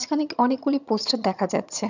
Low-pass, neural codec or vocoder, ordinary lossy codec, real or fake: 7.2 kHz; vocoder, 22.05 kHz, 80 mel bands, HiFi-GAN; none; fake